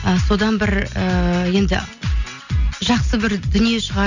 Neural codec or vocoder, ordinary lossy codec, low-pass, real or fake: none; none; 7.2 kHz; real